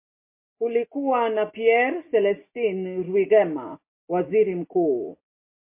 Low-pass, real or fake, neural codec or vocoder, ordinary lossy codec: 3.6 kHz; real; none; MP3, 24 kbps